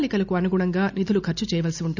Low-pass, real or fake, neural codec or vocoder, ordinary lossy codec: 7.2 kHz; real; none; none